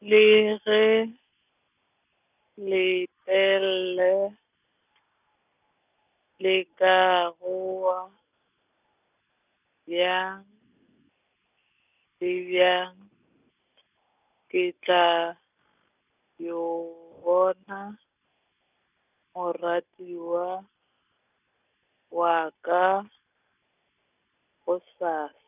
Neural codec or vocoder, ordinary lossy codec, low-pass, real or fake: none; none; 3.6 kHz; real